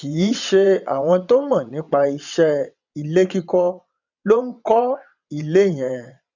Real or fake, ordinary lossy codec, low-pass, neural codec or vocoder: fake; none; 7.2 kHz; vocoder, 22.05 kHz, 80 mel bands, WaveNeXt